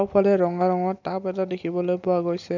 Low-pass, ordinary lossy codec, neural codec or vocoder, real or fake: 7.2 kHz; none; none; real